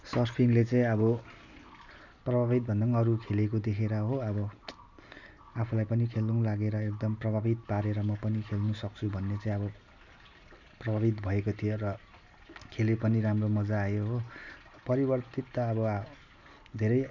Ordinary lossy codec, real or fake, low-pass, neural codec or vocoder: none; real; 7.2 kHz; none